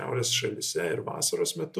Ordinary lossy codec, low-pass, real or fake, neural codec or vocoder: AAC, 96 kbps; 14.4 kHz; real; none